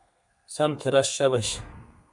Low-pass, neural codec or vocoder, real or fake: 10.8 kHz; codec, 32 kHz, 1.9 kbps, SNAC; fake